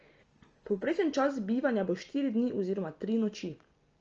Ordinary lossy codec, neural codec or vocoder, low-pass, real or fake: Opus, 24 kbps; none; 7.2 kHz; real